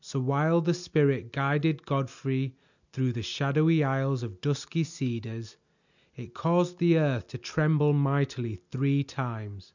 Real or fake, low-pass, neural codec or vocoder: real; 7.2 kHz; none